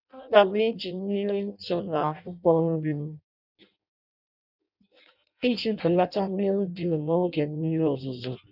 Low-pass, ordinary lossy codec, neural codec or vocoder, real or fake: 5.4 kHz; none; codec, 16 kHz in and 24 kHz out, 0.6 kbps, FireRedTTS-2 codec; fake